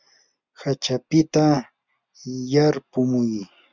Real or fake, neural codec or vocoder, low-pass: real; none; 7.2 kHz